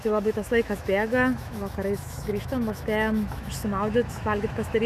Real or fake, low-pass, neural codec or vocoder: fake; 14.4 kHz; autoencoder, 48 kHz, 128 numbers a frame, DAC-VAE, trained on Japanese speech